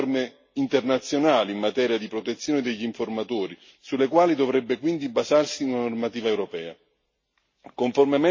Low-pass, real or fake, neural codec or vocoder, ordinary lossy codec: 7.2 kHz; real; none; MP3, 32 kbps